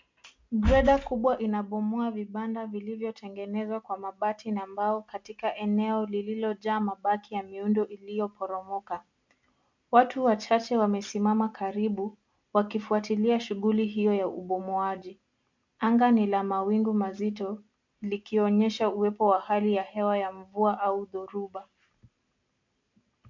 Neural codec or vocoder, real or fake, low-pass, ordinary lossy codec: none; real; 7.2 kHz; MP3, 64 kbps